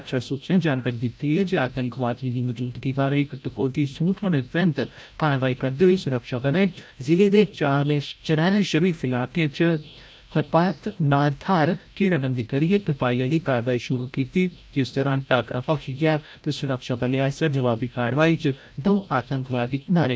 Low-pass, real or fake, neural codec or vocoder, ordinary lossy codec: none; fake; codec, 16 kHz, 0.5 kbps, FreqCodec, larger model; none